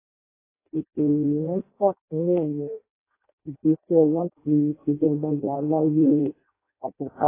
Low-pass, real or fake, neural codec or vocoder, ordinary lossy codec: 3.6 kHz; fake; codec, 16 kHz in and 24 kHz out, 0.6 kbps, FireRedTTS-2 codec; AAC, 16 kbps